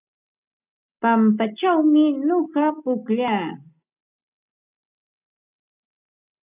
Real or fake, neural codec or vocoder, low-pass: real; none; 3.6 kHz